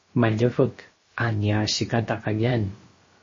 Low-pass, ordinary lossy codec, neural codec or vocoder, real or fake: 7.2 kHz; MP3, 32 kbps; codec, 16 kHz, about 1 kbps, DyCAST, with the encoder's durations; fake